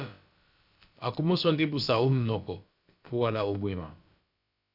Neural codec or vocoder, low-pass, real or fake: codec, 16 kHz, about 1 kbps, DyCAST, with the encoder's durations; 5.4 kHz; fake